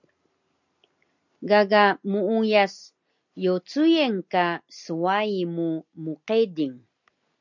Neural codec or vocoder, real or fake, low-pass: none; real; 7.2 kHz